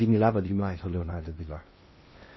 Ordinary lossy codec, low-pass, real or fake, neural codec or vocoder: MP3, 24 kbps; 7.2 kHz; fake; codec, 16 kHz in and 24 kHz out, 0.6 kbps, FocalCodec, streaming, 2048 codes